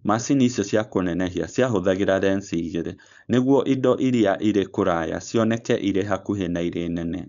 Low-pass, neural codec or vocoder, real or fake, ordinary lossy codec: 7.2 kHz; codec, 16 kHz, 4.8 kbps, FACodec; fake; none